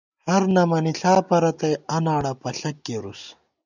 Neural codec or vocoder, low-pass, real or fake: none; 7.2 kHz; real